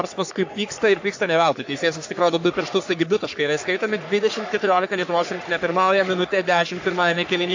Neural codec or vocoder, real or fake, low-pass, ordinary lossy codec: codec, 44.1 kHz, 3.4 kbps, Pupu-Codec; fake; 7.2 kHz; AAC, 48 kbps